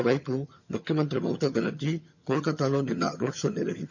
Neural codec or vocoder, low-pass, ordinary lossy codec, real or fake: vocoder, 22.05 kHz, 80 mel bands, HiFi-GAN; 7.2 kHz; none; fake